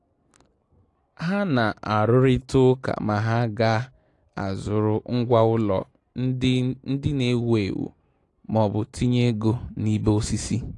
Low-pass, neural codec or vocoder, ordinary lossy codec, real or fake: 10.8 kHz; none; AAC, 48 kbps; real